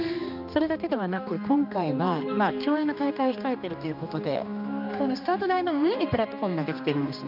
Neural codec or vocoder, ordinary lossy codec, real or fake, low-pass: codec, 16 kHz, 2 kbps, X-Codec, HuBERT features, trained on general audio; none; fake; 5.4 kHz